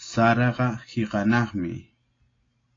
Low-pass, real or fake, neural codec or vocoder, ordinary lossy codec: 7.2 kHz; real; none; AAC, 32 kbps